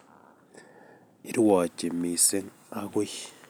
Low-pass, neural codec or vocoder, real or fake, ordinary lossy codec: none; none; real; none